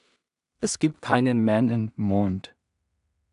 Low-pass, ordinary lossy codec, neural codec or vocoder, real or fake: 10.8 kHz; AAC, 96 kbps; codec, 16 kHz in and 24 kHz out, 0.4 kbps, LongCat-Audio-Codec, two codebook decoder; fake